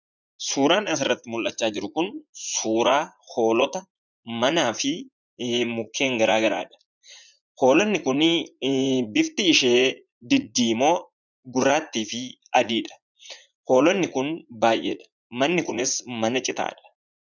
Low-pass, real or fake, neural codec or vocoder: 7.2 kHz; fake; vocoder, 44.1 kHz, 80 mel bands, Vocos